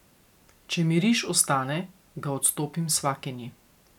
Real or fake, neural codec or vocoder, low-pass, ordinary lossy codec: real; none; 19.8 kHz; none